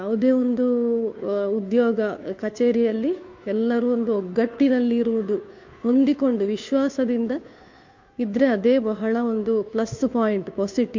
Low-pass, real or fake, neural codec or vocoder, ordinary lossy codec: 7.2 kHz; fake; codec, 16 kHz, 2 kbps, FunCodec, trained on Chinese and English, 25 frames a second; MP3, 48 kbps